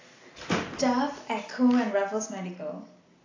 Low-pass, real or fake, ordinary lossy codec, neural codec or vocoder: 7.2 kHz; real; AAC, 32 kbps; none